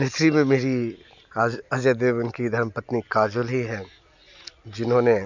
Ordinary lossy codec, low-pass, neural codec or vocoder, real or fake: none; 7.2 kHz; none; real